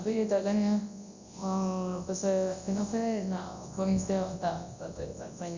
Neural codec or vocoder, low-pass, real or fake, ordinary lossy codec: codec, 24 kHz, 0.9 kbps, WavTokenizer, large speech release; 7.2 kHz; fake; Opus, 64 kbps